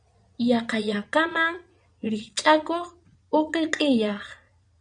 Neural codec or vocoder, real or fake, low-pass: vocoder, 22.05 kHz, 80 mel bands, Vocos; fake; 9.9 kHz